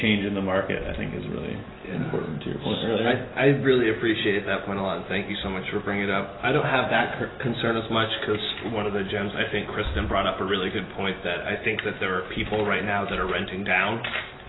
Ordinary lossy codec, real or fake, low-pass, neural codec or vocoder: AAC, 16 kbps; real; 7.2 kHz; none